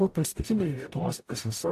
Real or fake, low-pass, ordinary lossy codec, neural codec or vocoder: fake; 14.4 kHz; AAC, 64 kbps; codec, 44.1 kHz, 0.9 kbps, DAC